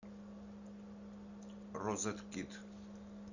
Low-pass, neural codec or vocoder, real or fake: 7.2 kHz; none; real